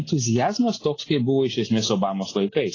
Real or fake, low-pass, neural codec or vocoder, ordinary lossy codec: real; 7.2 kHz; none; AAC, 32 kbps